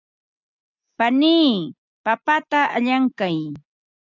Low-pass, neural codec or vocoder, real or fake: 7.2 kHz; none; real